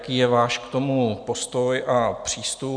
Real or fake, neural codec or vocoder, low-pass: real; none; 9.9 kHz